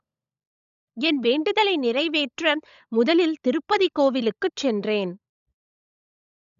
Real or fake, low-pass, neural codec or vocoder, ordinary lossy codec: fake; 7.2 kHz; codec, 16 kHz, 16 kbps, FunCodec, trained on LibriTTS, 50 frames a second; none